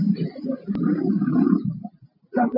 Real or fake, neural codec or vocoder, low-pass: real; none; 5.4 kHz